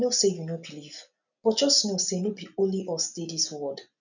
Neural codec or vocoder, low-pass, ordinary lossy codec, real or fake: none; 7.2 kHz; none; real